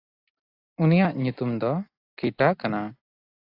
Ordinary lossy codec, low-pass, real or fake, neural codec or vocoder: AAC, 32 kbps; 5.4 kHz; real; none